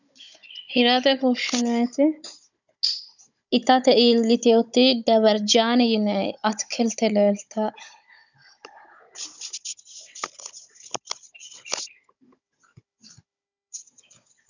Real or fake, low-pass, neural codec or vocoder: fake; 7.2 kHz; codec, 16 kHz, 16 kbps, FunCodec, trained on Chinese and English, 50 frames a second